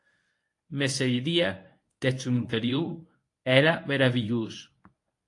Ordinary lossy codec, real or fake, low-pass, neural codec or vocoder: AAC, 48 kbps; fake; 10.8 kHz; codec, 24 kHz, 0.9 kbps, WavTokenizer, medium speech release version 1